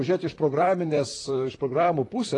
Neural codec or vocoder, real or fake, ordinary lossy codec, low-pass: vocoder, 44.1 kHz, 128 mel bands, Pupu-Vocoder; fake; AAC, 32 kbps; 10.8 kHz